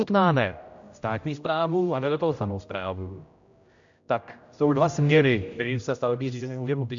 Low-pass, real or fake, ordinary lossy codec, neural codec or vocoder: 7.2 kHz; fake; MP3, 64 kbps; codec, 16 kHz, 0.5 kbps, X-Codec, HuBERT features, trained on general audio